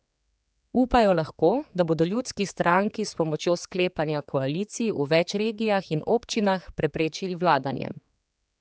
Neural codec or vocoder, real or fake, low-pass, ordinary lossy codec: codec, 16 kHz, 4 kbps, X-Codec, HuBERT features, trained on general audio; fake; none; none